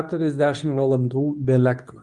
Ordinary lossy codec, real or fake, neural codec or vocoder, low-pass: Opus, 32 kbps; fake; codec, 24 kHz, 0.9 kbps, WavTokenizer, medium speech release version 2; 10.8 kHz